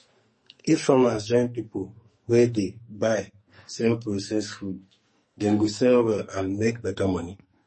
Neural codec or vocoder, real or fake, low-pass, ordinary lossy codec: codec, 44.1 kHz, 2.6 kbps, SNAC; fake; 10.8 kHz; MP3, 32 kbps